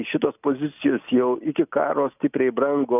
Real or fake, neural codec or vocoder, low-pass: real; none; 3.6 kHz